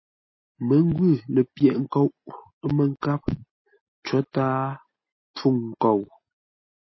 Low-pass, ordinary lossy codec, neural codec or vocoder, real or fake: 7.2 kHz; MP3, 24 kbps; none; real